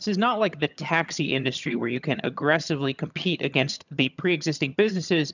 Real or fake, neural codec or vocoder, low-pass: fake; vocoder, 22.05 kHz, 80 mel bands, HiFi-GAN; 7.2 kHz